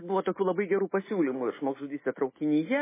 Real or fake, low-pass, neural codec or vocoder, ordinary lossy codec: real; 3.6 kHz; none; MP3, 16 kbps